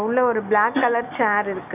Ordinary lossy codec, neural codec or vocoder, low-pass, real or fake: none; none; 3.6 kHz; real